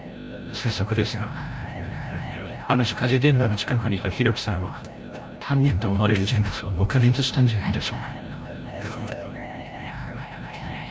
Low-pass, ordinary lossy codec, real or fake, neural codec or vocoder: none; none; fake; codec, 16 kHz, 0.5 kbps, FreqCodec, larger model